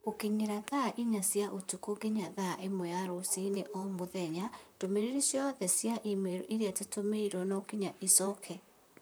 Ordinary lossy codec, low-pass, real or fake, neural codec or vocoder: none; none; fake; vocoder, 44.1 kHz, 128 mel bands, Pupu-Vocoder